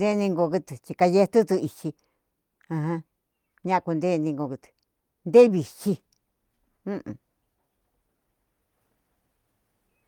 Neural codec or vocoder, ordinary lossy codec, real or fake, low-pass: none; Opus, 32 kbps; real; 19.8 kHz